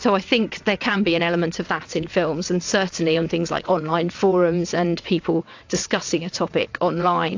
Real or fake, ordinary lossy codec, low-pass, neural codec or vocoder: fake; AAC, 48 kbps; 7.2 kHz; vocoder, 22.05 kHz, 80 mel bands, WaveNeXt